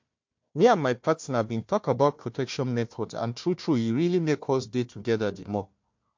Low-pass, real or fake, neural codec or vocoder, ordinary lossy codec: 7.2 kHz; fake; codec, 16 kHz, 1 kbps, FunCodec, trained on Chinese and English, 50 frames a second; MP3, 48 kbps